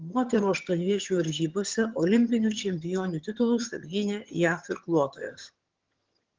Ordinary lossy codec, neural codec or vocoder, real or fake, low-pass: Opus, 16 kbps; vocoder, 22.05 kHz, 80 mel bands, HiFi-GAN; fake; 7.2 kHz